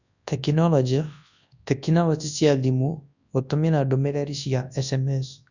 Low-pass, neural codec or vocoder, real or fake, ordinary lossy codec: 7.2 kHz; codec, 24 kHz, 0.9 kbps, WavTokenizer, large speech release; fake; none